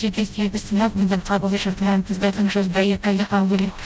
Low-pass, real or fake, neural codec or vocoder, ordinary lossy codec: none; fake; codec, 16 kHz, 0.5 kbps, FreqCodec, smaller model; none